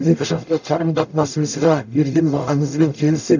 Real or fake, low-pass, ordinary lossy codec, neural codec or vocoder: fake; 7.2 kHz; none; codec, 44.1 kHz, 0.9 kbps, DAC